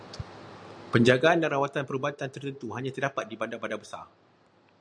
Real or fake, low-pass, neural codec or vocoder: real; 9.9 kHz; none